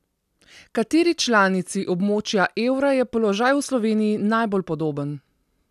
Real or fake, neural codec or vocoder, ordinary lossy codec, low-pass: real; none; none; 14.4 kHz